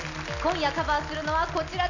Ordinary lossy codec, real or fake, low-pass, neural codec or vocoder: none; real; 7.2 kHz; none